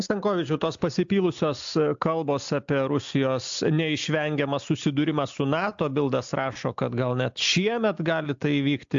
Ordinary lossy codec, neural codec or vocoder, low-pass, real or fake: AAC, 64 kbps; none; 7.2 kHz; real